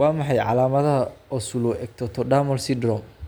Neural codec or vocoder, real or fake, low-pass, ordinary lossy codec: none; real; none; none